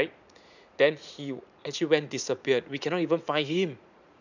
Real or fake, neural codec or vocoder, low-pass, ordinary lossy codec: fake; vocoder, 44.1 kHz, 80 mel bands, Vocos; 7.2 kHz; none